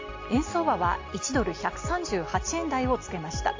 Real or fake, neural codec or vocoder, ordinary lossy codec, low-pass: real; none; AAC, 48 kbps; 7.2 kHz